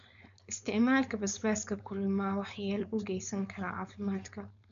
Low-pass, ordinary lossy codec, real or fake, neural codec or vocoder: 7.2 kHz; none; fake; codec, 16 kHz, 4.8 kbps, FACodec